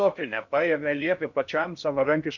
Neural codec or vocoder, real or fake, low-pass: codec, 16 kHz in and 24 kHz out, 0.6 kbps, FocalCodec, streaming, 2048 codes; fake; 7.2 kHz